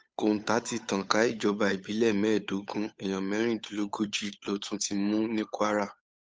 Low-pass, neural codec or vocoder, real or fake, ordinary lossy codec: none; codec, 16 kHz, 8 kbps, FunCodec, trained on Chinese and English, 25 frames a second; fake; none